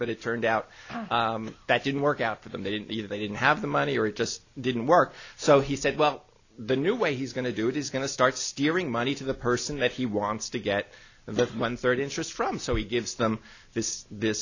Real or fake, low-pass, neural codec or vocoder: real; 7.2 kHz; none